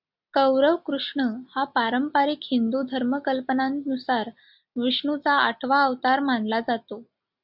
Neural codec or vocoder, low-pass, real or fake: none; 5.4 kHz; real